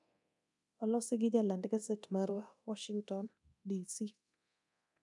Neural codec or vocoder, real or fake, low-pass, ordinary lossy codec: codec, 24 kHz, 0.9 kbps, DualCodec; fake; 10.8 kHz; none